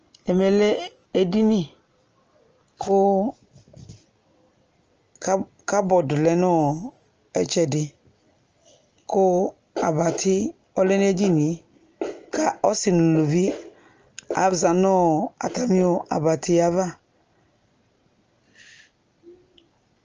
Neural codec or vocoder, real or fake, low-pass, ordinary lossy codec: none; real; 7.2 kHz; Opus, 24 kbps